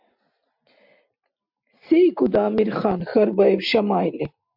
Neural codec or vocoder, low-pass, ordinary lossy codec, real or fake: none; 5.4 kHz; MP3, 48 kbps; real